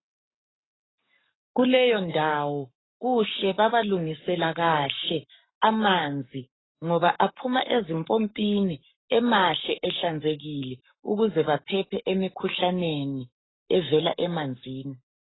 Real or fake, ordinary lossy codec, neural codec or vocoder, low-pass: fake; AAC, 16 kbps; codec, 44.1 kHz, 7.8 kbps, Pupu-Codec; 7.2 kHz